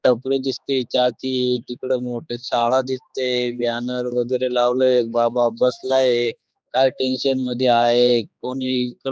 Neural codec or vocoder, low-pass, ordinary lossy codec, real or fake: codec, 16 kHz, 2 kbps, X-Codec, HuBERT features, trained on general audio; none; none; fake